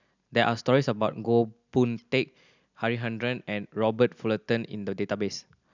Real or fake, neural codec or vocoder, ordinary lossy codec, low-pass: real; none; none; 7.2 kHz